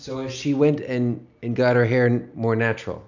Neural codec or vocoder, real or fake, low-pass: none; real; 7.2 kHz